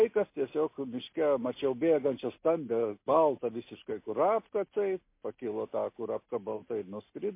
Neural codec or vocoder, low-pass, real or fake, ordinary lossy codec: none; 3.6 kHz; real; MP3, 24 kbps